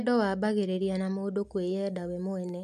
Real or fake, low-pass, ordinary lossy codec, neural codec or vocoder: real; 14.4 kHz; MP3, 96 kbps; none